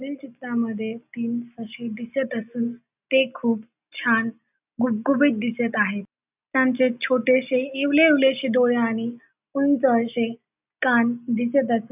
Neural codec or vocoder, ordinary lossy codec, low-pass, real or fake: none; none; 3.6 kHz; real